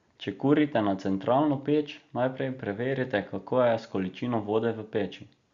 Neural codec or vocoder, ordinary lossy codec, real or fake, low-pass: none; Opus, 64 kbps; real; 7.2 kHz